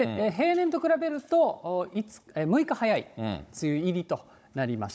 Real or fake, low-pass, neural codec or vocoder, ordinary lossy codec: fake; none; codec, 16 kHz, 16 kbps, FunCodec, trained on Chinese and English, 50 frames a second; none